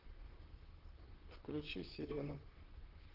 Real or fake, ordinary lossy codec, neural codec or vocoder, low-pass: fake; Opus, 16 kbps; vocoder, 44.1 kHz, 128 mel bands, Pupu-Vocoder; 5.4 kHz